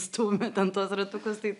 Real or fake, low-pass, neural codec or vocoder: real; 10.8 kHz; none